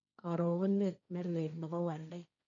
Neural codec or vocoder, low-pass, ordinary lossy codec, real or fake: codec, 16 kHz, 1.1 kbps, Voila-Tokenizer; 7.2 kHz; none; fake